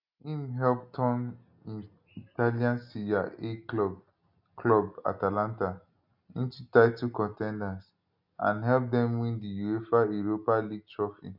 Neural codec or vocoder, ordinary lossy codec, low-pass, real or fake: none; none; 5.4 kHz; real